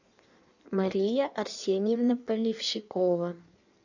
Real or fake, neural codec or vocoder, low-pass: fake; codec, 16 kHz in and 24 kHz out, 1.1 kbps, FireRedTTS-2 codec; 7.2 kHz